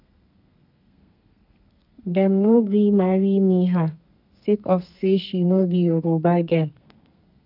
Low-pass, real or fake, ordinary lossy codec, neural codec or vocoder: 5.4 kHz; fake; none; codec, 32 kHz, 1.9 kbps, SNAC